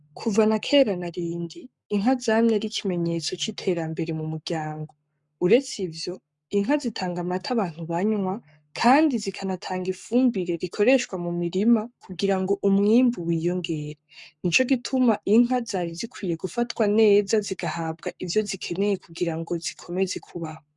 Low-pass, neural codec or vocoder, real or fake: 10.8 kHz; codec, 44.1 kHz, 7.8 kbps, Pupu-Codec; fake